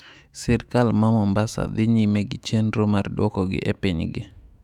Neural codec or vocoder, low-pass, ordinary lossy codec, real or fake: autoencoder, 48 kHz, 128 numbers a frame, DAC-VAE, trained on Japanese speech; 19.8 kHz; none; fake